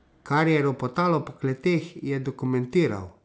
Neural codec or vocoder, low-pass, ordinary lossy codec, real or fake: none; none; none; real